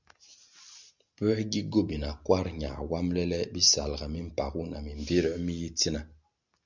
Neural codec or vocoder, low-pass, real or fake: none; 7.2 kHz; real